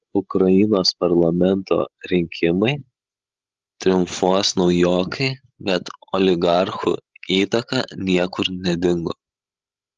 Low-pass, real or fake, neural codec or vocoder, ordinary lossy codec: 7.2 kHz; fake; codec, 16 kHz, 16 kbps, FreqCodec, larger model; Opus, 24 kbps